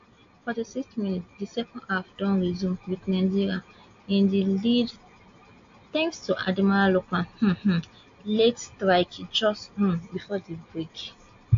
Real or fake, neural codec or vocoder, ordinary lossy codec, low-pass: real; none; AAC, 48 kbps; 7.2 kHz